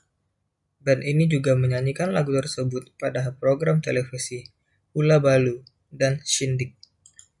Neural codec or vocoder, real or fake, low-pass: none; real; 10.8 kHz